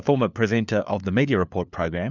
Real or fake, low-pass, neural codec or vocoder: fake; 7.2 kHz; codec, 16 kHz, 4 kbps, FunCodec, trained on LibriTTS, 50 frames a second